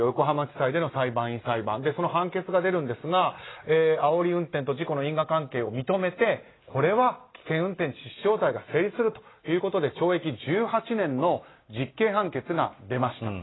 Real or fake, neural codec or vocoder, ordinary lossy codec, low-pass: real; none; AAC, 16 kbps; 7.2 kHz